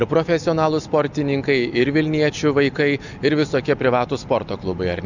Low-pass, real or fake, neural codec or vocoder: 7.2 kHz; real; none